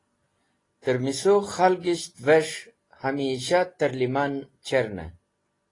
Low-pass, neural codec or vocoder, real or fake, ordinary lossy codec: 10.8 kHz; none; real; AAC, 32 kbps